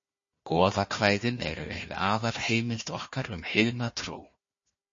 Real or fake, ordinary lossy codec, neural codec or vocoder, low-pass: fake; MP3, 32 kbps; codec, 16 kHz, 1 kbps, FunCodec, trained on Chinese and English, 50 frames a second; 7.2 kHz